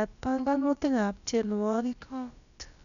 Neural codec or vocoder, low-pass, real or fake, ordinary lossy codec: codec, 16 kHz, about 1 kbps, DyCAST, with the encoder's durations; 7.2 kHz; fake; none